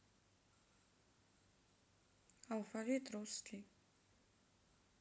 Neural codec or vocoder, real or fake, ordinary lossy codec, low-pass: none; real; none; none